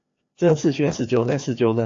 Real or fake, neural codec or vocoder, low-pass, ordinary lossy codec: fake; codec, 16 kHz, 2 kbps, FreqCodec, larger model; 7.2 kHz; AAC, 48 kbps